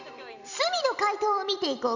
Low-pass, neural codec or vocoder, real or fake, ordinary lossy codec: 7.2 kHz; vocoder, 44.1 kHz, 128 mel bands every 256 samples, BigVGAN v2; fake; none